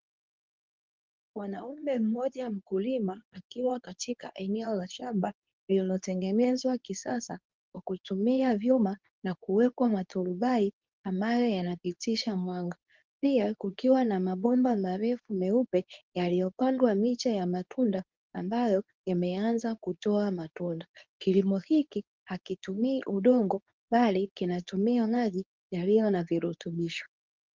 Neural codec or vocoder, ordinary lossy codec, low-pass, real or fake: codec, 24 kHz, 0.9 kbps, WavTokenizer, medium speech release version 2; Opus, 32 kbps; 7.2 kHz; fake